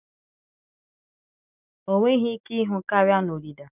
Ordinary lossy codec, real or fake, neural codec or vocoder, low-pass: none; real; none; 3.6 kHz